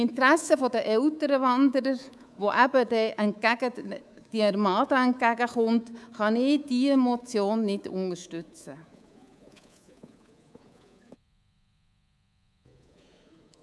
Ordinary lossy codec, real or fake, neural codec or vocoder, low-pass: none; fake; codec, 24 kHz, 3.1 kbps, DualCodec; none